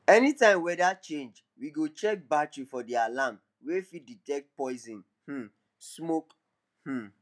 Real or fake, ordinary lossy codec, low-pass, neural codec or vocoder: real; none; none; none